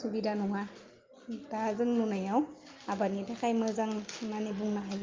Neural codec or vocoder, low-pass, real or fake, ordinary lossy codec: none; 7.2 kHz; real; Opus, 24 kbps